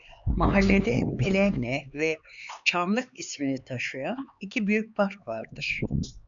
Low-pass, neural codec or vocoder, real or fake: 7.2 kHz; codec, 16 kHz, 4 kbps, X-Codec, HuBERT features, trained on LibriSpeech; fake